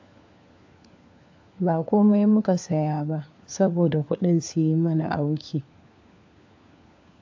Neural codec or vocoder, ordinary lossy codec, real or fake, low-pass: codec, 16 kHz, 4 kbps, FunCodec, trained on LibriTTS, 50 frames a second; AAC, 48 kbps; fake; 7.2 kHz